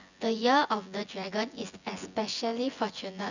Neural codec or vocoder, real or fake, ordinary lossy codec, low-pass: vocoder, 24 kHz, 100 mel bands, Vocos; fake; none; 7.2 kHz